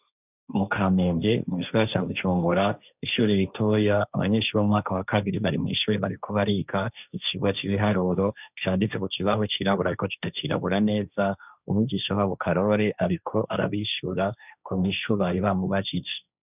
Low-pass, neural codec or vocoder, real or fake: 3.6 kHz; codec, 16 kHz, 1.1 kbps, Voila-Tokenizer; fake